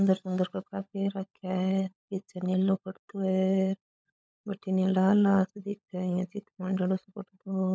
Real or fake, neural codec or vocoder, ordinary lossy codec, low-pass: fake; codec, 16 kHz, 16 kbps, FunCodec, trained on LibriTTS, 50 frames a second; none; none